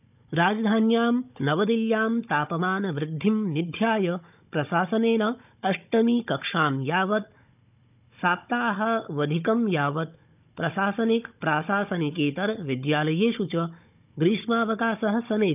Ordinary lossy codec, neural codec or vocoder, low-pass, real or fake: none; codec, 16 kHz, 16 kbps, FunCodec, trained on Chinese and English, 50 frames a second; 3.6 kHz; fake